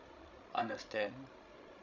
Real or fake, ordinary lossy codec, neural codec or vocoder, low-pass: fake; none; codec, 16 kHz, 16 kbps, FreqCodec, larger model; 7.2 kHz